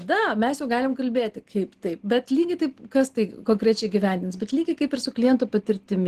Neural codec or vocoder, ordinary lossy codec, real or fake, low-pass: none; Opus, 16 kbps; real; 14.4 kHz